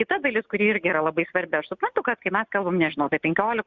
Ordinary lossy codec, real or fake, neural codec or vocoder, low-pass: Opus, 64 kbps; real; none; 7.2 kHz